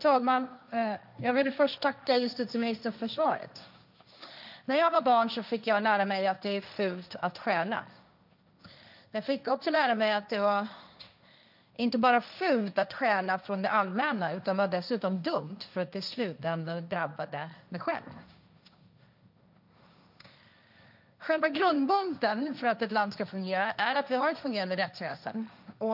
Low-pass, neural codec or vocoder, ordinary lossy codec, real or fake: 5.4 kHz; codec, 16 kHz, 1.1 kbps, Voila-Tokenizer; none; fake